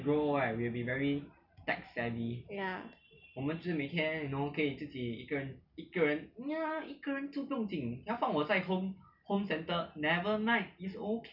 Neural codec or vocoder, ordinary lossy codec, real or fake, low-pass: none; none; real; 5.4 kHz